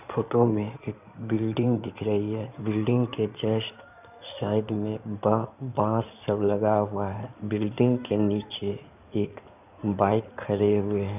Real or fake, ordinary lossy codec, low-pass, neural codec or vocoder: fake; none; 3.6 kHz; codec, 16 kHz in and 24 kHz out, 2.2 kbps, FireRedTTS-2 codec